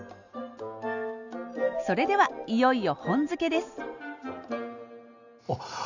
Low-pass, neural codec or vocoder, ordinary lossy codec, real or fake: 7.2 kHz; none; none; real